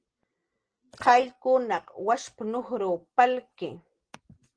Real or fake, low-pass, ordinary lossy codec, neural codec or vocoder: real; 9.9 kHz; Opus, 16 kbps; none